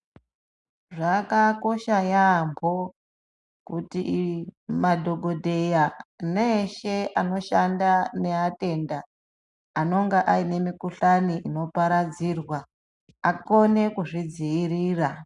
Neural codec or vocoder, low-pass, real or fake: none; 10.8 kHz; real